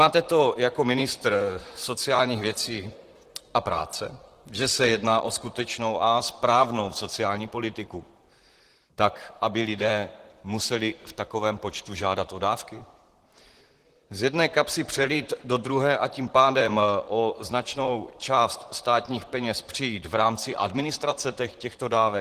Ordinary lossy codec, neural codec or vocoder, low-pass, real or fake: Opus, 16 kbps; vocoder, 44.1 kHz, 128 mel bands, Pupu-Vocoder; 14.4 kHz; fake